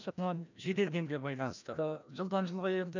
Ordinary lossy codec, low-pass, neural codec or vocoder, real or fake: none; 7.2 kHz; codec, 16 kHz, 1 kbps, FreqCodec, larger model; fake